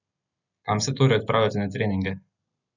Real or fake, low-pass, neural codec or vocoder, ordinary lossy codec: real; 7.2 kHz; none; none